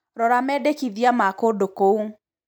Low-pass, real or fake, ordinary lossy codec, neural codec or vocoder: 19.8 kHz; real; none; none